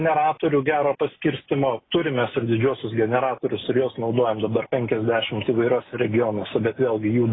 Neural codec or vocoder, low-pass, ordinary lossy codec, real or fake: none; 7.2 kHz; AAC, 16 kbps; real